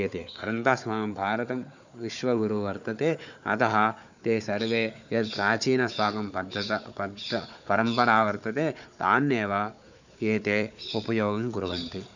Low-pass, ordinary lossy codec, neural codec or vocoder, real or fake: 7.2 kHz; none; codec, 16 kHz, 4 kbps, FunCodec, trained on Chinese and English, 50 frames a second; fake